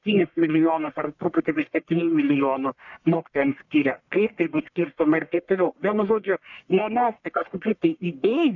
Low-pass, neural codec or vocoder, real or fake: 7.2 kHz; codec, 44.1 kHz, 1.7 kbps, Pupu-Codec; fake